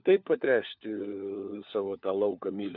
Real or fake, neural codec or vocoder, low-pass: fake; codec, 16 kHz, 4 kbps, FunCodec, trained on LibriTTS, 50 frames a second; 5.4 kHz